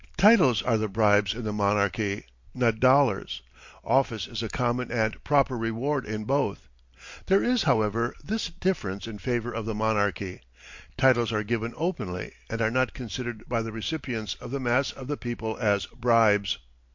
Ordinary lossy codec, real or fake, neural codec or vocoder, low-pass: MP3, 48 kbps; real; none; 7.2 kHz